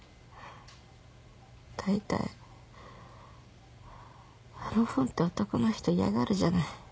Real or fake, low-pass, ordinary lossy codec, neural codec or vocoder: real; none; none; none